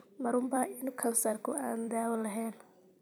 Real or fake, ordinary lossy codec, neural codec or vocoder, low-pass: fake; none; vocoder, 44.1 kHz, 128 mel bands every 256 samples, BigVGAN v2; none